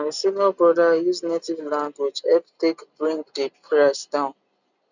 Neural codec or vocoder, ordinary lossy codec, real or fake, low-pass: none; none; real; 7.2 kHz